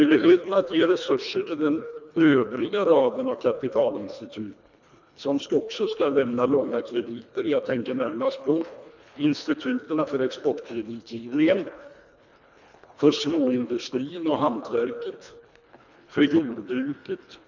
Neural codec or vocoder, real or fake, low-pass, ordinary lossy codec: codec, 24 kHz, 1.5 kbps, HILCodec; fake; 7.2 kHz; none